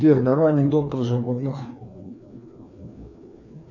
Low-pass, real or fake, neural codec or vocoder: 7.2 kHz; fake; codec, 16 kHz, 2 kbps, FreqCodec, larger model